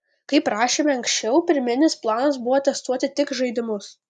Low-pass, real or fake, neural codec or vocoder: 10.8 kHz; real; none